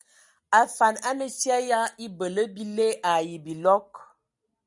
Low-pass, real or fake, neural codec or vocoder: 10.8 kHz; real; none